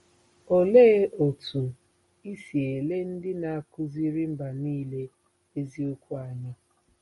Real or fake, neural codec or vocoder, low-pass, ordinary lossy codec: real; none; 19.8 kHz; MP3, 48 kbps